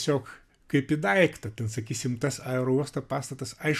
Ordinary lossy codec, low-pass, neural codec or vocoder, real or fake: AAC, 96 kbps; 14.4 kHz; none; real